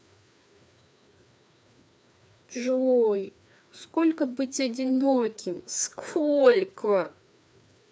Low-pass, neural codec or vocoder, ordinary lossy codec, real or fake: none; codec, 16 kHz, 2 kbps, FreqCodec, larger model; none; fake